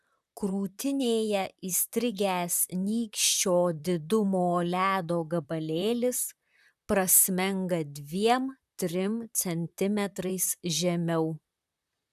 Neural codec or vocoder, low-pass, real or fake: vocoder, 44.1 kHz, 128 mel bands, Pupu-Vocoder; 14.4 kHz; fake